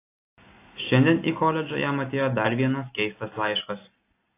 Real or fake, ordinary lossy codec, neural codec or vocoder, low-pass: real; AAC, 24 kbps; none; 3.6 kHz